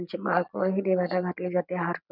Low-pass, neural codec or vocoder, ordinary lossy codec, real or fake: 5.4 kHz; none; none; real